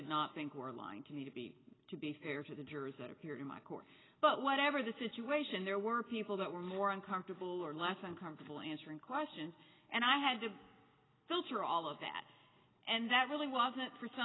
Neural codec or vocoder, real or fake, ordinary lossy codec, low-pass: none; real; AAC, 16 kbps; 7.2 kHz